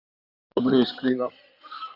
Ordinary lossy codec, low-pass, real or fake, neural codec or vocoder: none; 5.4 kHz; fake; codec, 16 kHz in and 24 kHz out, 2.2 kbps, FireRedTTS-2 codec